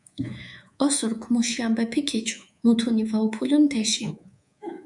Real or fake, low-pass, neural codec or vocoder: fake; 10.8 kHz; codec, 24 kHz, 3.1 kbps, DualCodec